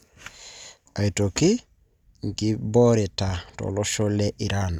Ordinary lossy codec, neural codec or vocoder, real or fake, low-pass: none; none; real; 19.8 kHz